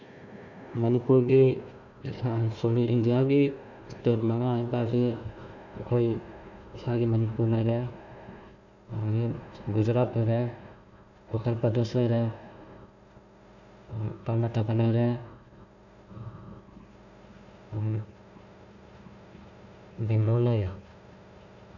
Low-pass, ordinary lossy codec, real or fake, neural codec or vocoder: 7.2 kHz; none; fake; codec, 16 kHz, 1 kbps, FunCodec, trained on Chinese and English, 50 frames a second